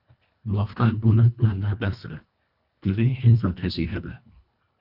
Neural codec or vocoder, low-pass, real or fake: codec, 24 kHz, 1.5 kbps, HILCodec; 5.4 kHz; fake